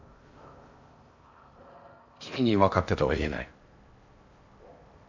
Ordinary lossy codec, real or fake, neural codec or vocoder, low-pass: MP3, 48 kbps; fake; codec, 16 kHz in and 24 kHz out, 0.6 kbps, FocalCodec, streaming, 4096 codes; 7.2 kHz